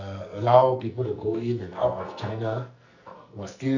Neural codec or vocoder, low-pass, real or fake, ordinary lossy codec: codec, 44.1 kHz, 2.6 kbps, SNAC; 7.2 kHz; fake; none